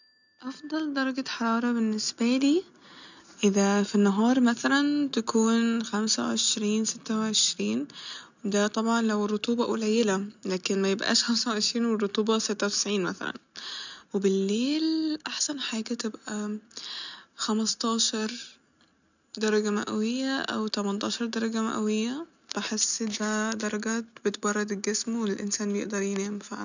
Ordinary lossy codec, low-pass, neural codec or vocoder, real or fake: none; 7.2 kHz; none; real